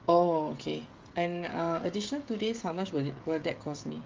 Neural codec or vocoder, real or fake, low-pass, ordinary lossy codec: codec, 16 kHz, 16 kbps, FreqCodec, smaller model; fake; 7.2 kHz; Opus, 32 kbps